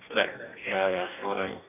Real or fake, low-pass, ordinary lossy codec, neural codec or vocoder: fake; 3.6 kHz; none; codec, 44.1 kHz, 2.6 kbps, DAC